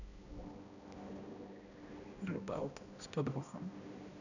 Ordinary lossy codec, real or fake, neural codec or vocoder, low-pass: none; fake; codec, 16 kHz, 1 kbps, X-Codec, HuBERT features, trained on balanced general audio; 7.2 kHz